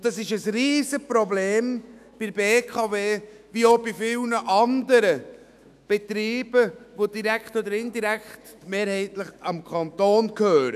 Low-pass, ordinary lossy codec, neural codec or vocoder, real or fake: 14.4 kHz; none; autoencoder, 48 kHz, 128 numbers a frame, DAC-VAE, trained on Japanese speech; fake